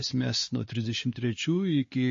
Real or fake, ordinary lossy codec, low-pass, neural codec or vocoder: fake; MP3, 32 kbps; 7.2 kHz; codec, 16 kHz, 2 kbps, X-Codec, WavLM features, trained on Multilingual LibriSpeech